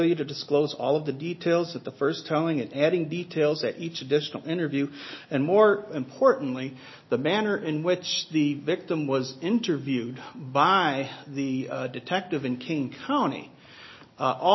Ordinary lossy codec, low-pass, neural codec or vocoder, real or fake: MP3, 24 kbps; 7.2 kHz; none; real